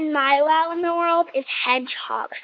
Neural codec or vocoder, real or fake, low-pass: codec, 16 kHz, 4 kbps, X-Codec, WavLM features, trained on Multilingual LibriSpeech; fake; 7.2 kHz